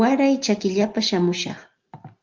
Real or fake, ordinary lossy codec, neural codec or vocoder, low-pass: real; Opus, 24 kbps; none; 7.2 kHz